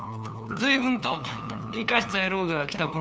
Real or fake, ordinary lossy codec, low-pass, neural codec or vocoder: fake; none; none; codec, 16 kHz, 2 kbps, FunCodec, trained on LibriTTS, 25 frames a second